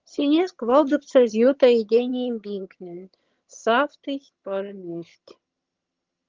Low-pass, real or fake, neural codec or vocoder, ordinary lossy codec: 7.2 kHz; fake; vocoder, 22.05 kHz, 80 mel bands, HiFi-GAN; Opus, 32 kbps